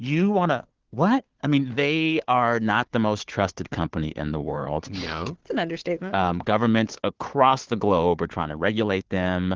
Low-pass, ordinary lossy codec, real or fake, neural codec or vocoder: 7.2 kHz; Opus, 16 kbps; fake; codec, 16 kHz, 4 kbps, FunCodec, trained on Chinese and English, 50 frames a second